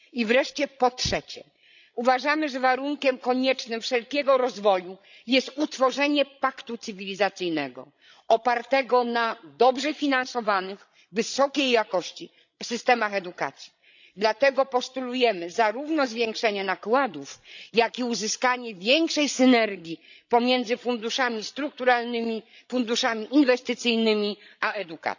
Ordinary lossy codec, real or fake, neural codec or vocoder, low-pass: none; fake; codec, 16 kHz, 16 kbps, FreqCodec, larger model; 7.2 kHz